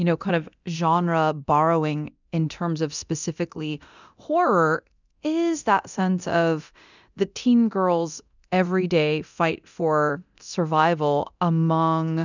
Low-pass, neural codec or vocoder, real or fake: 7.2 kHz; codec, 24 kHz, 0.9 kbps, DualCodec; fake